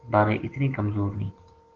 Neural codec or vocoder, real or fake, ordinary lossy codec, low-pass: none; real; Opus, 16 kbps; 7.2 kHz